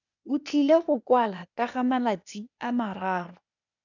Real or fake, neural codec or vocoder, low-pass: fake; codec, 16 kHz, 0.8 kbps, ZipCodec; 7.2 kHz